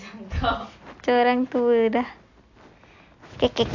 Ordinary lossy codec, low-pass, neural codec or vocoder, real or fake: AAC, 48 kbps; 7.2 kHz; none; real